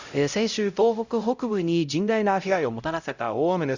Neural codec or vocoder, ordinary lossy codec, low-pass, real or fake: codec, 16 kHz, 0.5 kbps, X-Codec, HuBERT features, trained on LibriSpeech; Opus, 64 kbps; 7.2 kHz; fake